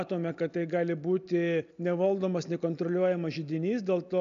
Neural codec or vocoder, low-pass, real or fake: none; 7.2 kHz; real